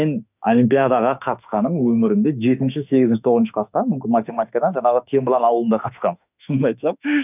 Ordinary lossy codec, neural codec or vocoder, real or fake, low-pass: none; autoencoder, 48 kHz, 32 numbers a frame, DAC-VAE, trained on Japanese speech; fake; 3.6 kHz